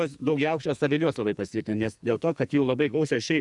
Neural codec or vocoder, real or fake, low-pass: codec, 44.1 kHz, 2.6 kbps, SNAC; fake; 10.8 kHz